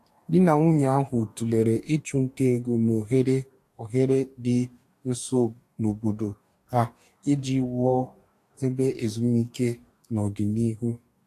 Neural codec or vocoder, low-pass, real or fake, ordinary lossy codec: codec, 44.1 kHz, 2.6 kbps, DAC; 14.4 kHz; fake; AAC, 64 kbps